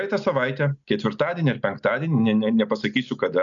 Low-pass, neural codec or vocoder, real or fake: 7.2 kHz; none; real